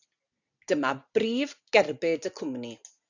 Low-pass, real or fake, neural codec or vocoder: 7.2 kHz; real; none